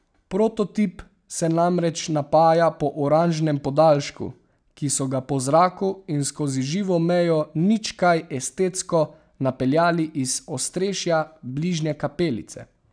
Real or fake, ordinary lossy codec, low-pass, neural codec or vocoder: real; none; 9.9 kHz; none